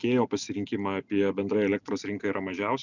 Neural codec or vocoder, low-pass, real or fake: none; 7.2 kHz; real